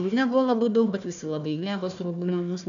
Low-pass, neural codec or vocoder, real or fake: 7.2 kHz; codec, 16 kHz, 1 kbps, FunCodec, trained on Chinese and English, 50 frames a second; fake